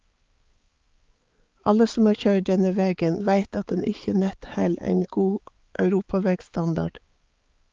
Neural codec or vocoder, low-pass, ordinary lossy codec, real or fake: codec, 16 kHz, 4 kbps, X-Codec, HuBERT features, trained on balanced general audio; 7.2 kHz; Opus, 32 kbps; fake